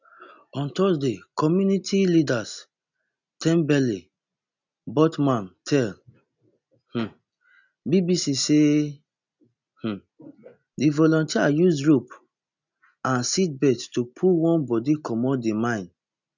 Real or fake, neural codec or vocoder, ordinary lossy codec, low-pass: real; none; none; 7.2 kHz